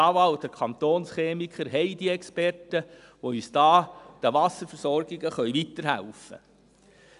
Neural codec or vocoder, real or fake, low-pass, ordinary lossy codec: none; real; 10.8 kHz; none